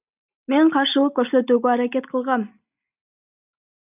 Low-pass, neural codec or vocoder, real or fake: 3.6 kHz; none; real